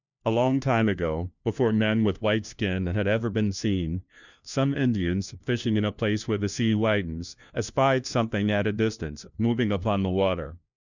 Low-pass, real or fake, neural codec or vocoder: 7.2 kHz; fake; codec, 16 kHz, 1 kbps, FunCodec, trained on LibriTTS, 50 frames a second